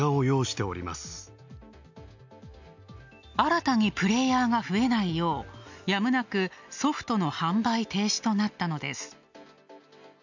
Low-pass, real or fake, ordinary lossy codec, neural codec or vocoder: 7.2 kHz; real; none; none